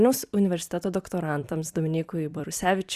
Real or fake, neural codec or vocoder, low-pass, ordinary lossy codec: fake; vocoder, 44.1 kHz, 128 mel bands every 256 samples, BigVGAN v2; 14.4 kHz; Opus, 64 kbps